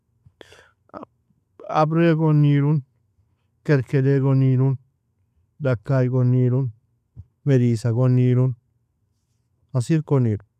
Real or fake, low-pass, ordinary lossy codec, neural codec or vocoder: real; 14.4 kHz; none; none